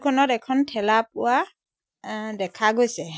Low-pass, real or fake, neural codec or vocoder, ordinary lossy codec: none; real; none; none